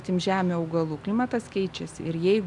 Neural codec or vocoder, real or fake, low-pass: none; real; 10.8 kHz